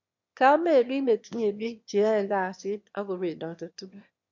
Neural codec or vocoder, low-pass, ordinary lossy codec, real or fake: autoencoder, 22.05 kHz, a latent of 192 numbers a frame, VITS, trained on one speaker; 7.2 kHz; MP3, 48 kbps; fake